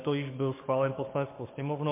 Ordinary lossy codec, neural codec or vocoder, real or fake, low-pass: MP3, 24 kbps; codec, 44.1 kHz, 7.8 kbps, DAC; fake; 3.6 kHz